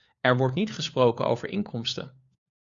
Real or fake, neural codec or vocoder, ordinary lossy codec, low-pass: fake; codec, 16 kHz, 4 kbps, FunCodec, trained on LibriTTS, 50 frames a second; Opus, 64 kbps; 7.2 kHz